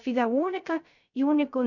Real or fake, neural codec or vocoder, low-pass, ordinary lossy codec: fake; codec, 16 kHz, 0.3 kbps, FocalCodec; 7.2 kHz; none